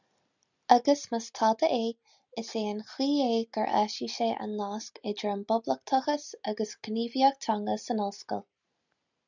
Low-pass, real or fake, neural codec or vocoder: 7.2 kHz; real; none